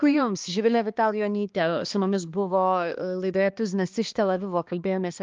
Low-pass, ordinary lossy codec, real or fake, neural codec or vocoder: 7.2 kHz; Opus, 24 kbps; fake; codec, 16 kHz, 2 kbps, X-Codec, HuBERT features, trained on balanced general audio